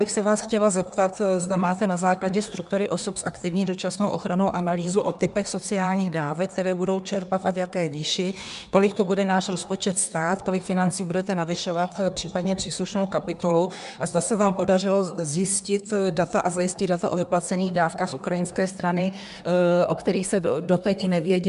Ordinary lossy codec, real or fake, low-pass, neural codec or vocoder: MP3, 96 kbps; fake; 10.8 kHz; codec, 24 kHz, 1 kbps, SNAC